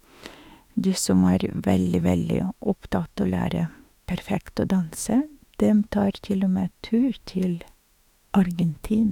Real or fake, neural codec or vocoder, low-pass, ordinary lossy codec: fake; autoencoder, 48 kHz, 32 numbers a frame, DAC-VAE, trained on Japanese speech; 19.8 kHz; none